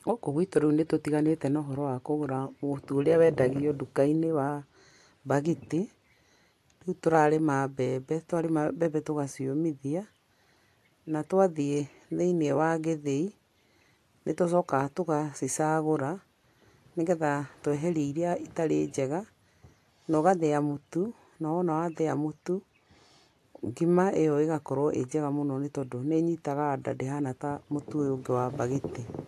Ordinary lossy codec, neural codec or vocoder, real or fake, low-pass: AAC, 64 kbps; none; real; 14.4 kHz